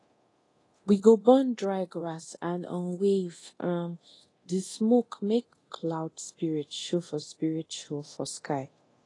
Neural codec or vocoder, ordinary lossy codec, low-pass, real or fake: codec, 24 kHz, 0.9 kbps, DualCodec; AAC, 32 kbps; 10.8 kHz; fake